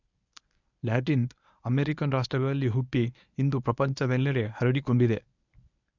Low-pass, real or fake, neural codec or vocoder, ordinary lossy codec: 7.2 kHz; fake; codec, 24 kHz, 0.9 kbps, WavTokenizer, medium speech release version 1; none